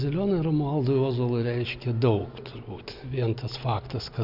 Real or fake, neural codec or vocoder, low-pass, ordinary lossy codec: real; none; 5.4 kHz; AAC, 48 kbps